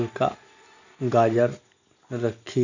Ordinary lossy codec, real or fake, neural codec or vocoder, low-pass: none; real; none; 7.2 kHz